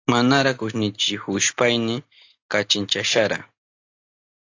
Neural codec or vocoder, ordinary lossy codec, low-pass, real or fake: none; AAC, 48 kbps; 7.2 kHz; real